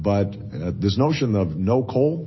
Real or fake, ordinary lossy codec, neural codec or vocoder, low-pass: real; MP3, 24 kbps; none; 7.2 kHz